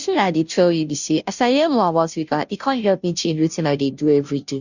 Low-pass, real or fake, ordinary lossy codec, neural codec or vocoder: 7.2 kHz; fake; none; codec, 16 kHz, 0.5 kbps, FunCodec, trained on Chinese and English, 25 frames a second